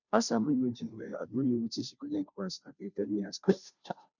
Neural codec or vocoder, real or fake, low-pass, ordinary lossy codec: codec, 16 kHz, 0.5 kbps, FunCodec, trained on Chinese and English, 25 frames a second; fake; 7.2 kHz; none